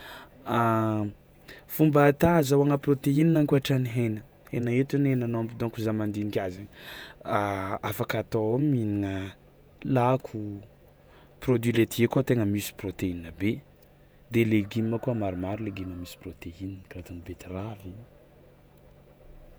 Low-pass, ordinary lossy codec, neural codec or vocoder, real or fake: none; none; vocoder, 48 kHz, 128 mel bands, Vocos; fake